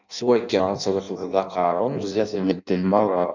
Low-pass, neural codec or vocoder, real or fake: 7.2 kHz; codec, 16 kHz in and 24 kHz out, 0.6 kbps, FireRedTTS-2 codec; fake